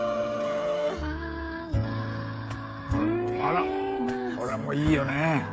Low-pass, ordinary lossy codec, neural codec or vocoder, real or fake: none; none; codec, 16 kHz, 16 kbps, FreqCodec, smaller model; fake